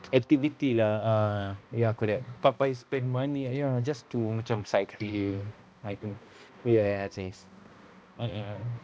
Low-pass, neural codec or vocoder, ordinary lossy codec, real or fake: none; codec, 16 kHz, 1 kbps, X-Codec, HuBERT features, trained on balanced general audio; none; fake